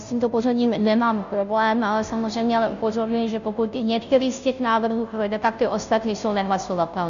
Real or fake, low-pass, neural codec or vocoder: fake; 7.2 kHz; codec, 16 kHz, 0.5 kbps, FunCodec, trained on Chinese and English, 25 frames a second